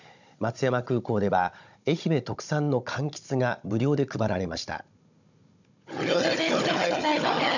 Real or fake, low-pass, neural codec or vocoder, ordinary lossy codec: fake; 7.2 kHz; codec, 16 kHz, 16 kbps, FunCodec, trained on Chinese and English, 50 frames a second; none